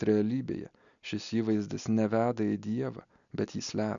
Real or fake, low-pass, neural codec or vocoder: real; 7.2 kHz; none